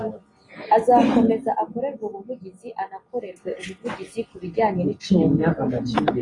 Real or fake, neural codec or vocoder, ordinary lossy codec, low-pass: real; none; AAC, 32 kbps; 10.8 kHz